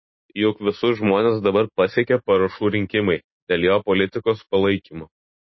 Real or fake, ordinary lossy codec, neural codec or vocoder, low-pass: real; MP3, 24 kbps; none; 7.2 kHz